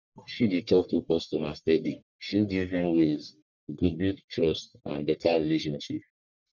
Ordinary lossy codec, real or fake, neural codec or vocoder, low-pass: none; fake; codec, 44.1 kHz, 1.7 kbps, Pupu-Codec; 7.2 kHz